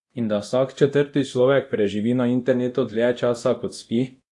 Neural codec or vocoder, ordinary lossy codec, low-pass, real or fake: codec, 24 kHz, 0.9 kbps, DualCodec; AAC, 64 kbps; 10.8 kHz; fake